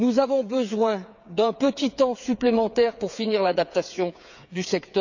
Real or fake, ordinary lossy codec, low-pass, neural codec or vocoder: fake; none; 7.2 kHz; codec, 16 kHz, 8 kbps, FreqCodec, smaller model